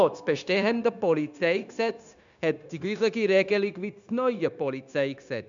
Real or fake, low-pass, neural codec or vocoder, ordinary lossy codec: fake; 7.2 kHz; codec, 16 kHz, 0.9 kbps, LongCat-Audio-Codec; none